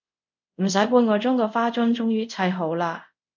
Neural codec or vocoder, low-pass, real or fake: codec, 24 kHz, 0.5 kbps, DualCodec; 7.2 kHz; fake